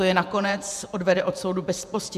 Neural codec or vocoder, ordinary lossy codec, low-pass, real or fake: vocoder, 48 kHz, 128 mel bands, Vocos; MP3, 96 kbps; 14.4 kHz; fake